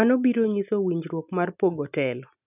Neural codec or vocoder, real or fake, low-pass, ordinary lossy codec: none; real; 3.6 kHz; none